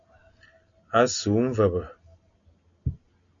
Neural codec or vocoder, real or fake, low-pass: none; real; 7.2 kHz